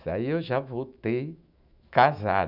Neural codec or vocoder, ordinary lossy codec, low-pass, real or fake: autoencoder, 48 kHz, 128 numbers a frame, DAC-VAE, trained on Japanese speech; none; 5.4 kHz; fake